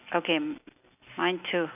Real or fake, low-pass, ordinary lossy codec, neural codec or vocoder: real; 3.6 kHz; none; none